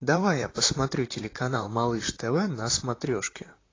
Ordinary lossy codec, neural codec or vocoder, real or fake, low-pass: AAC, 32 kbps; vocoder, 44.1 kHz, 128 mel bands, Pupu-Vocoder; fake; 7.2 kHz